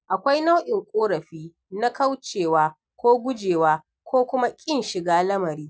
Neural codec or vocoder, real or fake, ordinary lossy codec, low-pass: none; real; none; none